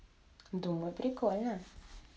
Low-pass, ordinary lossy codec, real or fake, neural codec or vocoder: none; none; real; none